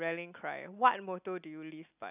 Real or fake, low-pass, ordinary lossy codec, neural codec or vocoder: real; 3.6 kHz; none; none